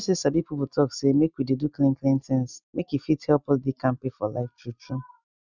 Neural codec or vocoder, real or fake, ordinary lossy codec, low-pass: none; real; none; 7.2 kHz